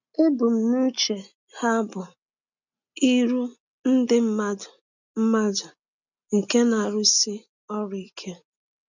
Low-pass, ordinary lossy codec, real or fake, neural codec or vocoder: 7.2 kHz; none; real; none